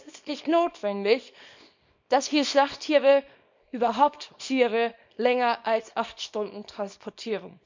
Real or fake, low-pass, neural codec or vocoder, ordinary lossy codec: fake; 7.2 kHz; codec, 24 kHz, 0.9 kbps, WavTokenizer, small release; MP3, 64 kbps